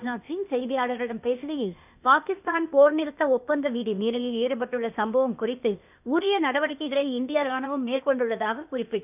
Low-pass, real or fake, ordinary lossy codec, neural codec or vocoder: 3.6 kHz; fake; none; codec, 16 kHz, 0.8 kbps, ZipCodec